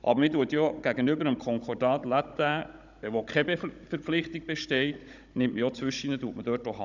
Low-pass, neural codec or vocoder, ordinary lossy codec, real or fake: 7.2 kHz; codec, 16 kHz, 16 kbps, FunCodec, trained on Chinese and English, 50 frames a second; none; fake